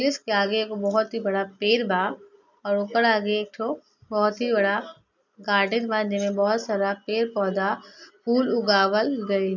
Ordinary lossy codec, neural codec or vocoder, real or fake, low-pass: none; none; real; 7.2 kHz